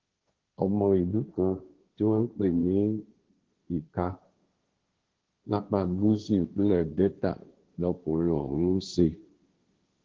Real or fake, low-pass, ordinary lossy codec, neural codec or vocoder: fake; 7.2 kHz; Opus, 16 kbps; codec, 16 kHz, 1.1 kbps, Voila-Tokenizer